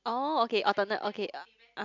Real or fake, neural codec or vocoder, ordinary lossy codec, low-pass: real; none; MP3, 64 kbps; 7.2 kHz